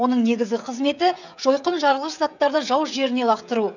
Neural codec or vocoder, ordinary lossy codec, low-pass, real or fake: codec, 16 kHz, 16 kbps, FreqCodec, smaller model; none; 7.2 kHz; fake